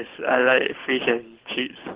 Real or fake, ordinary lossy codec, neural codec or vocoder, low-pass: fake; Opus, 16 kbps; codec, 24 kHz, 6 kbps, HILCodec; 3.6 kHz